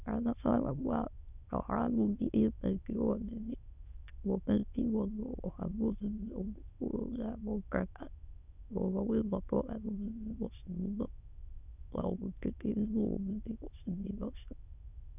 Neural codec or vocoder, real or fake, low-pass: autoencoder, 22.05 kHz, a latent of 192 numbers a frame, VITS, trained on many speakers; fake; 3.6 kHz